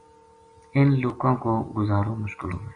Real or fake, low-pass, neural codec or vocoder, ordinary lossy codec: real; 9.9 kHz; none; MP3, 64 kbps